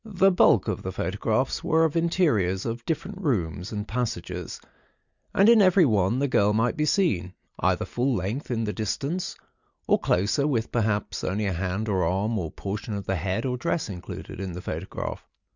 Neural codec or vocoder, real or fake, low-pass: none; real; 7.2 kHz